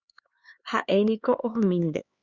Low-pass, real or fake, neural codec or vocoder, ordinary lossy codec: 7.2 kHz; fake; codec, 16 kHz, 4 kbps, X-Codec, HuBERT features, trained on LibriSpeech; Opus, 64 kbps